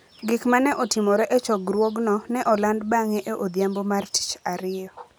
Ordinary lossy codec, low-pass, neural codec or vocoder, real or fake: none; none; none; real